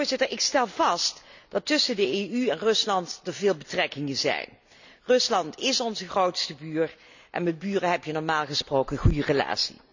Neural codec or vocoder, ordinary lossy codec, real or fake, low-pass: none; none; real; 7.2 kHz